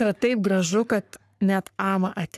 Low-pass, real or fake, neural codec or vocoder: 14.4 kHz; fake; codec, 44.1 kHz, 3.4 kbps, Pupu-Codec